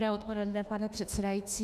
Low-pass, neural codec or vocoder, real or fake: 14.4 kHz; autoencoder, 48 kHz, 32 numbers a frame, DAC-VAE, trained on Japanese speech; fake